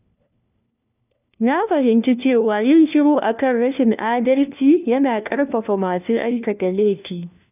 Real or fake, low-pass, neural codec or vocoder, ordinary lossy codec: fake; 3.6 kHz; codec, 16 kHz, 1 kbps, FunCodec, trained on LibriTTS, 50 frames a second; none